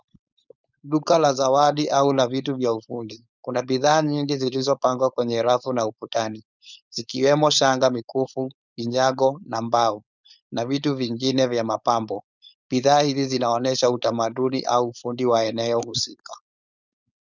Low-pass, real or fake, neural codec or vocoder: 7.2 kHz; fake; codec, 16 kHz, 4.8 kbps, FACodec